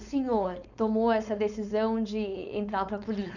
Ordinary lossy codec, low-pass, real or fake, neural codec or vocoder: none; 7.2 kHz; fake; codec, 16 kHz, 4.8 kbps, FACodec